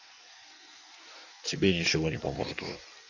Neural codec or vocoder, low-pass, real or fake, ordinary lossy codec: codec, 16 kHz in and 24 kHz out, 1.1 kbps, FireRedTTS-2 codec; 7.2 kHz; fake; none